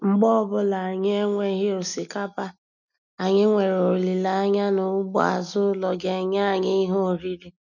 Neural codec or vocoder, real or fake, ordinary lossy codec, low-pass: none; real; none; 7.2 kHz